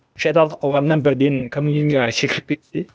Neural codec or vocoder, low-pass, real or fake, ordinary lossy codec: codec, 16 kHz, 0.8 kbps, ZipCodec; none; fake; none